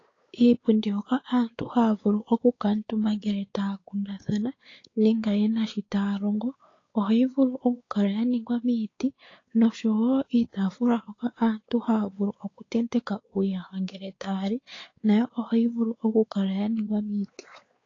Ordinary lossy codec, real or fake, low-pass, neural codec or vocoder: AAC, 32 kbps; fake; 7.2 kHz; codec, 16 kHz, 4 kbps, X-Codec, WavLM features, trained on Multilingual LibriSpeech